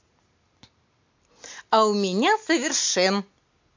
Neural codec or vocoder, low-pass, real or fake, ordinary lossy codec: none; 7.2 kHz; real; MP3, 48 kbps